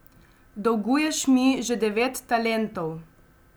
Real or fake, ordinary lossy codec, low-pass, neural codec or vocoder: real; none; none; none